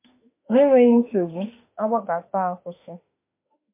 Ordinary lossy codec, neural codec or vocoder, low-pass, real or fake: MP3, 24 kbps; autoencoder, 48 kHz, 32 numbers a frame, DAC-VAE, trained on Japanese speech; 3.6 kHz; fake